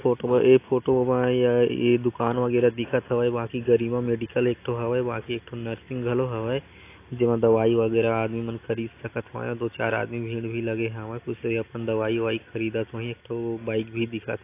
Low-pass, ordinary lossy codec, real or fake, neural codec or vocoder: 3.6 kHz; AAC, 24 kbps; real; none